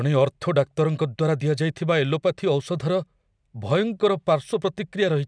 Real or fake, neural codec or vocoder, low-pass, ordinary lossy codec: real; none; 9.9 kHz; none